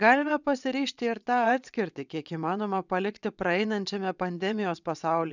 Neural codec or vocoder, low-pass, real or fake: vocoder, 22.05 kHz, 80 mel bands, WaveNeXt; 7.2 kHz; fake